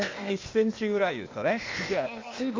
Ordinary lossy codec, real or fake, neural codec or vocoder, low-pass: MP3, 48 kbps; fake; codec, 16 kHz, 0.8 kbps, ZipCodec; 7.2 kHz